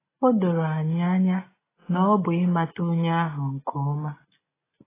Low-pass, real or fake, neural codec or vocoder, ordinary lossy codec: 3.6 kHz; real; none; AAC, 16 kbps